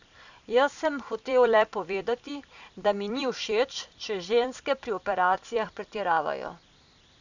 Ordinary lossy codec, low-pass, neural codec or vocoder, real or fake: none; 7.2 kHz; vocoder, 44.1 kHz, 128 mel bands every 256 samples, BigVGAN v2; fake